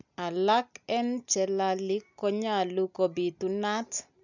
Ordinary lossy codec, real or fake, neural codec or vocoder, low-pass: none; real; none; 7.2 kHz